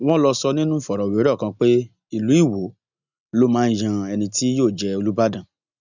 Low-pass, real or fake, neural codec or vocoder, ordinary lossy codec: 7.2 kHz; real; none; none